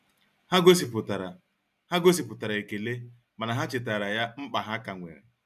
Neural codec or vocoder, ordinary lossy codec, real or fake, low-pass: none; none; real; 14.4 kHz